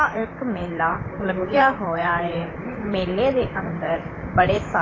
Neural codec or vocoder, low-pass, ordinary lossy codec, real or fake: vocoder, 44.1 kHz, 128 mel bands, Pupu-Vocoder; 7.2 kHz; AAC, 32 kbps; fake